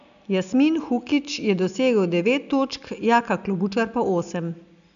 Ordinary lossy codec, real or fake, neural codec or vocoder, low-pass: none; real; none; 7.2 kHz